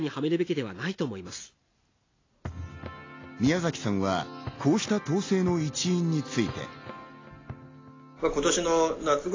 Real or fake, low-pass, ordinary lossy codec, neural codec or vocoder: real; 7.2 kHz; AAC, 32 kbps; none